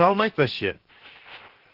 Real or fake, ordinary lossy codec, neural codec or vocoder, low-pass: fake; Opus, 16 kbps; codec, 16 kHz, 0.7 kbps, FocalCodec; 5.4 kHz